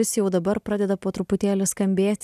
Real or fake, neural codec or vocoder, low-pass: real; none; 14.4 kHz